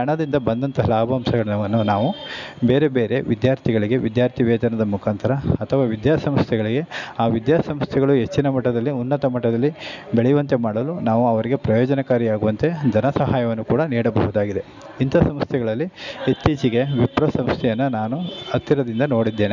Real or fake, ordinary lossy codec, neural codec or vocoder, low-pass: real; none; none; 7.2 kHz